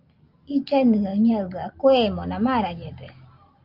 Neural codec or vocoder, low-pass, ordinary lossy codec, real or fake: none; 5.4 kHz; Opus, 24 kbps; real